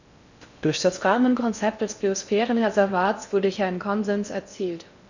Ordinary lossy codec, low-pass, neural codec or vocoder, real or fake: none; 7.2 kHz; codec, 16 kHz in and 24 kHz out, 0.6 kbps, FocalCodec, streaming, 4096 codes; fake